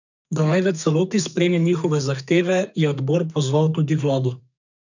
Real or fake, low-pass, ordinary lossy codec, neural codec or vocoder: fake; 7.2 kHz; none; codec, 32 kHz, 1.9 kbps, SNAC